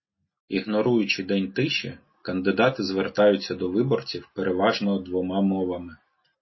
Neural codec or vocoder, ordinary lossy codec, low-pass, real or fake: none; MP3, 24 kbps; 7.2 kHz; real